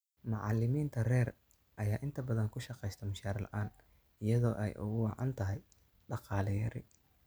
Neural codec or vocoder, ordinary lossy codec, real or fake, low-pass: none; none; real; none